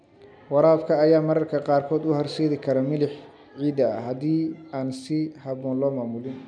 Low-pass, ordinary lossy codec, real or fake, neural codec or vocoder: 9.9 kHz; none; real; none